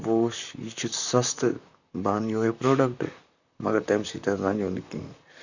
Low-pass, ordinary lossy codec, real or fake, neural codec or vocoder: 7.2 kHz; none; fake; vocoder, 44.1 kHz, 128 mel bands, Pupu-Vocoder